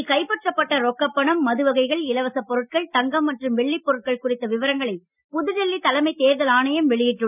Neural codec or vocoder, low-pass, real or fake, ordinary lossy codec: none; 3.6 kHz; real; none